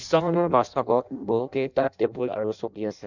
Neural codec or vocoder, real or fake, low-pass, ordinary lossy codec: codec, 16 kHz in and 24 kHz out, 0.6 kbps, FireRedTTS-2 codec; fake; 7.2 kHz; none